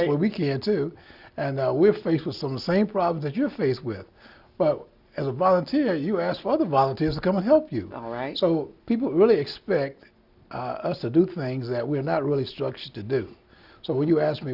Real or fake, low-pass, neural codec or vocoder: real; 5.4 kHz; none